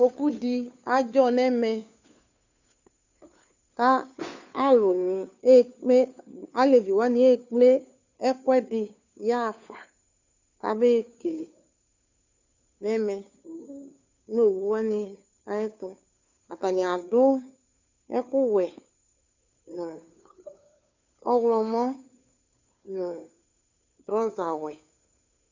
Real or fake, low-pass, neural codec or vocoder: fake; 7.2 kHz; codec, 16 kHz, 2 kbps, FunCodec, trained on Chinese and English, 25 frames a second